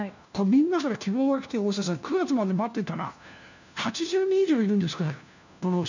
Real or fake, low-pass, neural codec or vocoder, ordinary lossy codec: fake; 7.2 kHz; codec, 16 kHz, 1 kbps, FunCodec, trained on LibriTTS, 50 frames a second; none